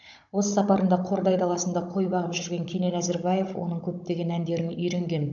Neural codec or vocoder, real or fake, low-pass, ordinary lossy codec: codec, 16 kHz, 16 kbps, FunCodec, trained on Chinese and English, 50 frames a second; fake; 7.2 kHz; AAC, 64 kbps